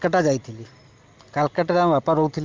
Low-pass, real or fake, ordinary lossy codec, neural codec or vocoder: 7.2 kHz; real; Opus, 32 kbps; none